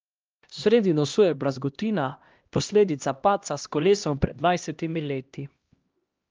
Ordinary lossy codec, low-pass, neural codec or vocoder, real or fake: Opus, 24 kbps; 7.2 kHz; codec, 16 kHz, 1 kbps, X-Codec, HuBERT features, trained on LibriSpeech; fake